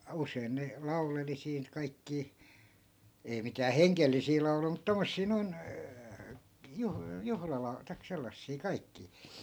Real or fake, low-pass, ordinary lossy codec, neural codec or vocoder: real; none; none; none